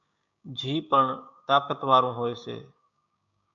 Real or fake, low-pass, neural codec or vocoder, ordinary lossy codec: fake; 7.2 kHz; codec, 16 kHz, 6 kbps, DAC; MP3, 64 kbps